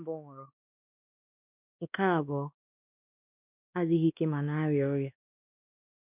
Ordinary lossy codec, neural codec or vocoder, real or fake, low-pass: none; codec, 16 kHz, 2 kbps, X-Codec, WavLM features, trained on Multilingual LibriSpeech; fake; 3.6 kHz